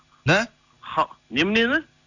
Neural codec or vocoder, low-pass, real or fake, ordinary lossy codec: none; 7.2 kHz; real; none